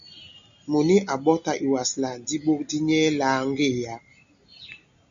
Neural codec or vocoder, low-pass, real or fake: none; 7.2 kHz; real